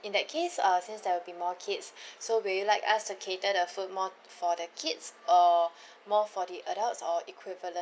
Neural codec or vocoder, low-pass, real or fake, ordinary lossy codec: none; none; real; none